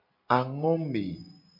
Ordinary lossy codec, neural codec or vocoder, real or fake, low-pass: MP3, 32 kbps; none; real; 5.4 kHz